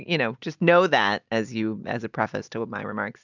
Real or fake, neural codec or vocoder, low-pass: real; none; 7.2 kHz